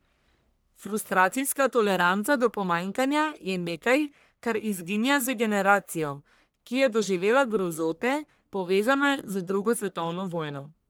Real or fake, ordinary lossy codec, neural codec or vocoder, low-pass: fake; none; codec, 44.1 kHz, 1.7 kbps, Pupu-Codec; none